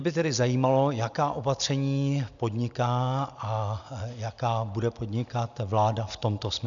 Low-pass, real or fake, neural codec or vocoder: 7.2 kHz; real; none